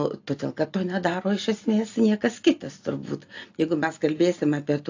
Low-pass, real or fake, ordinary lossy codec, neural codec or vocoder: 7.2 kHz; real; AAC, 48 kbps; none